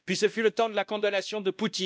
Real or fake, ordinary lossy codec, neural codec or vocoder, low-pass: fake; none; codec, 16 kHz, 1 kbps, X-Codec, WavLM features, trained on Multilingual LibriSpeech; none